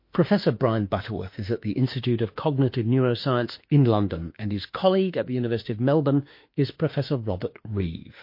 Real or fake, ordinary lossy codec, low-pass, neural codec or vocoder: fake; MP3, 32 kbps; 5.4 kHz; autoencoder, 48 kHz, 32 numbers a frame, DAC-VAE, trained on Japanese speech